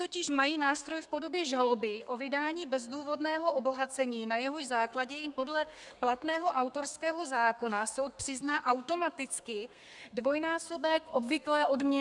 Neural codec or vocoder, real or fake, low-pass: codec, 32 kHz, 1.9 kbps, SNAC; fake; 10.8 kHz